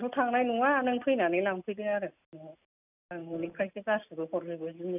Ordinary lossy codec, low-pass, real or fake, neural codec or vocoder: none; 3.6 kHz; real; none